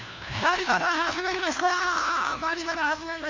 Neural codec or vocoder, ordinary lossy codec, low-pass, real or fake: codec, 16 kHz, 1 kbps, FunCodec, trained on LibriTTS, 50 frames a second; MP3, 64 kbps; 7.2 kHz; fake